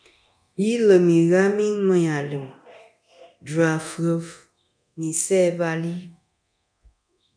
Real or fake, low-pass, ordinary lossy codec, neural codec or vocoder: fake; 9.9 kHz; MP3, 96 kbps; codec, 24 kHz, 0.9 kbps, DualCodec